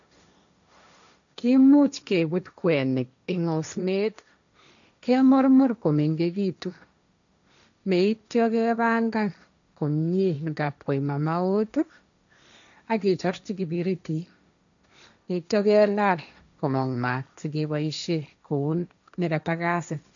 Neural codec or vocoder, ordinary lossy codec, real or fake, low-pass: codec, 16 kHz, 1.1 kbps, Voila-Tokenizer; none; fake; 7.2 kHz